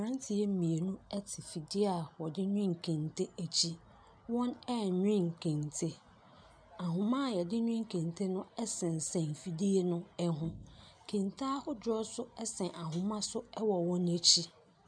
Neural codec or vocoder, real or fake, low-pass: none; real; 9.9 kHz